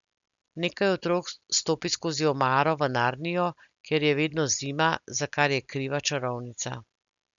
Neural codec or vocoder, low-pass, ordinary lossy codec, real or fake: none; 7.2 kHz; none; real